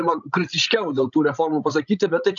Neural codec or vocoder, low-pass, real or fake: codec, 16 kHz, 16 kbps, FreqCodec, larger model; 7.2 kHz; fake